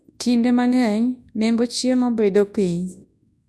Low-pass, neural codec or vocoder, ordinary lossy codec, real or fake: none; codec, 24 kHz, 0.9 kbps, WavTokenizer, large speech release; none; fake